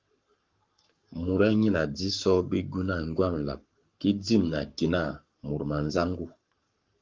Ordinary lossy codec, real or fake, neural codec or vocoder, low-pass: Opus, 32 kbps; fake; codec, 44.1 kHz, 7.8 kbps, Pupu-Codec; 7.2 kHz